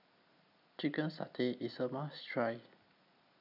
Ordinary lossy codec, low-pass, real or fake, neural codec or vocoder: none; 5.4 kHz; real; none